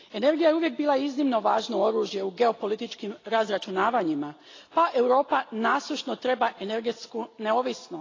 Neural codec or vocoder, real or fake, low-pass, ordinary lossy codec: none; real; 7.2 kHz; AAC, 32 kbps